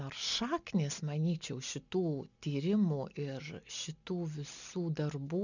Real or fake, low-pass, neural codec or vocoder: real; 7.2 kHz; none